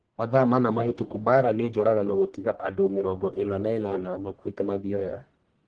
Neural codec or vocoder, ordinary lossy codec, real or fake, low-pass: codec, 44.1 kHz, 1.7 kbps, Pupu-Codec; Opus, 16 kbps; fake; 9.9 kHz